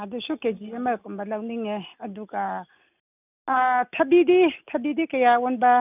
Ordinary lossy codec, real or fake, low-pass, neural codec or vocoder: none; real; 3.6 kHz; none